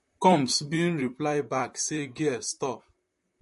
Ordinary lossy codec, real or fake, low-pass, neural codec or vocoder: MP3, 48 kbps; fake; 14.4 kHz; vocoder, 44.1 kHz, 128 mel bands, Pupu-Vocoder